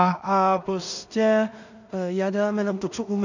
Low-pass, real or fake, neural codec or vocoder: 7.2 kHz; fake; codec, 16 kHz in and 24 kHz out, 0.4 kbps, LongCat-Audio-Codec, two codebook decoder